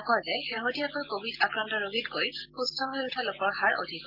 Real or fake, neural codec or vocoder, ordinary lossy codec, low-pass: real; none; Opus, 24 kbps; 5.4 kHz